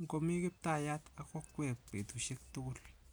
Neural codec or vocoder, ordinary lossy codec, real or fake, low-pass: none; none; real; none